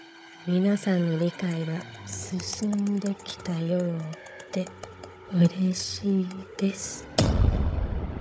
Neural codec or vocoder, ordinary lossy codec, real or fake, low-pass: codec, 16 kHz, 16 kbps, FunCodec, trained on Chinese and English, 50 frames a second; none; fake; none